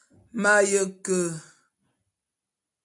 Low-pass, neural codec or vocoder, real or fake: 10.8 kHz; none; real